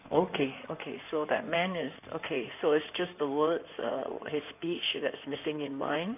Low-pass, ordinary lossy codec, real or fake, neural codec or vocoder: 3.6 kHz; none; fake; codec, 16 kHz in and 24 kHz out, 2.2 kbps, FireRedTTS-2 codec